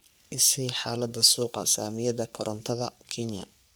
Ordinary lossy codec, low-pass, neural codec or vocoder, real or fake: none; none; codec, 44.1 kHz, 3.4 kbps, Pupu-Codec; fake